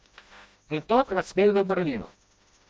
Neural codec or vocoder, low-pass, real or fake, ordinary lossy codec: codec, 16 kHz, 1 kbps, FreqCodec, smaller model; none; fake; none